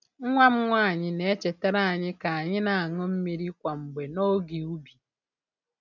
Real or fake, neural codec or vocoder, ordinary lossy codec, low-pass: real; none; none; 7.2 kHz